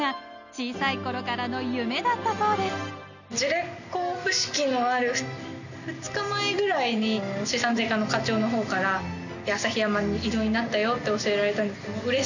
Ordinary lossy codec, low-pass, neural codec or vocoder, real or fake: none; 7.2 kHz; none; real